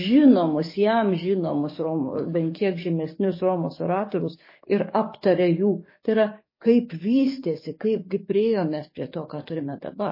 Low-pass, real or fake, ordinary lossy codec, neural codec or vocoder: 5.4 kHz; fake; MP3, 24 kbps; codec, 44.1 kHz, 7.8 kbps, DAC